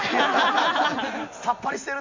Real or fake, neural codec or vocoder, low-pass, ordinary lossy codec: real; none; 7.2 kHz; MP3, 64 kbps